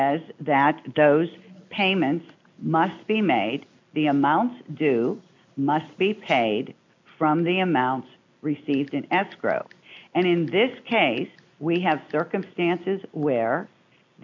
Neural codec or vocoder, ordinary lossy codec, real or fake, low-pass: none; MP3, 64 kbps; real; 7.2 kHz